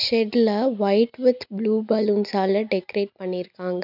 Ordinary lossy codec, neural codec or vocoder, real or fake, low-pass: none; none; real; 5.4 kHz